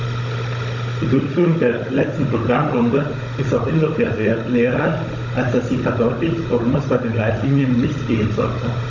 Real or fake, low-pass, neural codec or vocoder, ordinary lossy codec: fake; 7.2 kHz; codec, 16 kHz, 16 kbps, FunCodec, trained on Chinese and English, 50 frames a second; AAC, 48 kbps